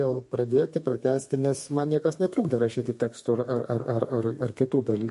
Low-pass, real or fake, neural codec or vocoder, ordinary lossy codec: 14.4 kHz; fake; codec, 32 kHz, 1.9 kbps, SNAC; MP3, 48 kbps